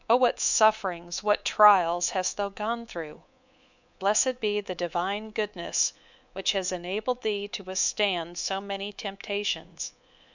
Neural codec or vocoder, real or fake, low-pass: codec, 24 kHz, 3.1 kbps, DualCodec; fake; 7.2 kHz